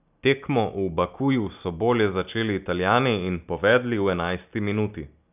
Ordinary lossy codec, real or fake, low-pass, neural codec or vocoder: none; real; 3.6 kHz; none